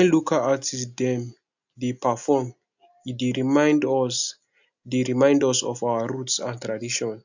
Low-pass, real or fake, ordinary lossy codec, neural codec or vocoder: 7.2 kHz; real; none; none